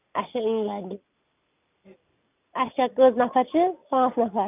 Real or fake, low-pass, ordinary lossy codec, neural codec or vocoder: real; 3.6 kHz; none; none